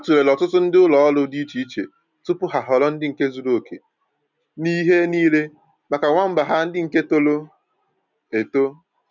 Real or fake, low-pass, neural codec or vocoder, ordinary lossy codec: real; 7.2 kHz; none; none